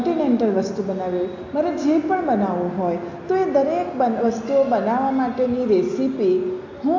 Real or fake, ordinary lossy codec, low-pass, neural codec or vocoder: real; AAC, 48 kbps; 7.2 kHz; none